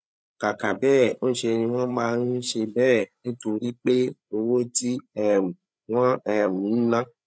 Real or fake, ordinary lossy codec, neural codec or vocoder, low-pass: fake; none; codec, 16 kHz, 8 kbps, FreqCodec, larger model; none